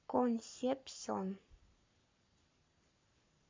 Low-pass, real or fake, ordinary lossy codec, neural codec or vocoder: 7.2 kHz; fake; MP3, 64 kbps; codec, 44.1 kHz, 7.8 kbps, Pupu-Codec